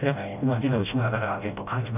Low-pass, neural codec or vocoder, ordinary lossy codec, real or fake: 3.6 kHz; codec, 16 kHz, 0.5 kbps, FreqCodec, smaller model; none; fake